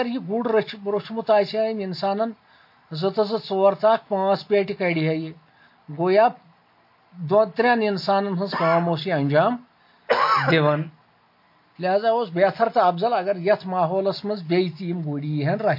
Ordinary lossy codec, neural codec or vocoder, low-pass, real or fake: MP3, 32 kbps; none; 5.4 kHz; real